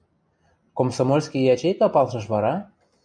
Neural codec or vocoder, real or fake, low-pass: none; real; 9.9 kHz